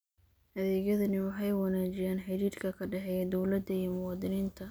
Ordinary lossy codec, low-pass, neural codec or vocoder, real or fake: none; none; none; real